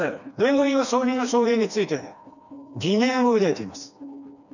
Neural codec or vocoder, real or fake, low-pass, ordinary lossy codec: codec, 16 kHz, 2 kbps, FreqCodec, smaller model; fake; 7.2 kHz; none